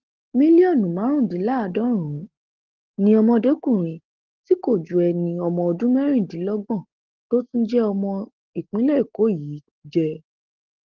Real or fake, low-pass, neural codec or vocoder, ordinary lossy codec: real; 7.2 kHz; none; Opus, 16 kbps